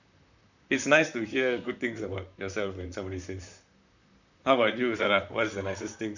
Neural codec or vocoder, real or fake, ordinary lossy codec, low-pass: vocoder, 44.1 kHz, 128 mel bands, Pupu-Vocoder; fake; none; 7.2 kHz